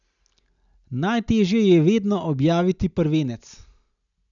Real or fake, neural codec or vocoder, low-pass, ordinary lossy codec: real; none; 7.2 kHz; none